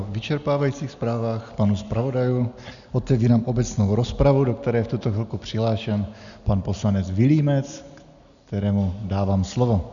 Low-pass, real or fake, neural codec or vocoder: 7.2 kHz; real; none